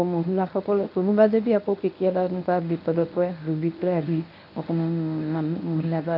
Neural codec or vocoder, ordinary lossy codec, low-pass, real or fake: codec, 24 kHz, 0.9 kbps, WavTokenizer, medium speech release version 1; none; 5.4 kHz; fake